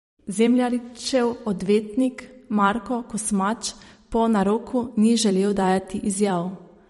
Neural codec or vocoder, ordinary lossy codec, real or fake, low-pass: vocoder, 44.1 kHz, 128 mel bands every 256 samples, BigVGAN v2; MP3, 48 kbps; fake; 19.8 kHz